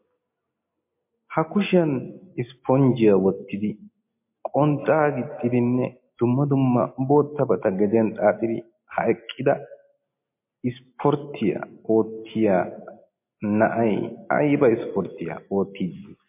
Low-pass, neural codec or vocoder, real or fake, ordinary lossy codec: 3.6 kHz; none; real; MP3, 24 kbps